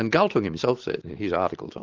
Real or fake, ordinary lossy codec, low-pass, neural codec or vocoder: real; Opus, 16 kbps; 7.2 kHz; none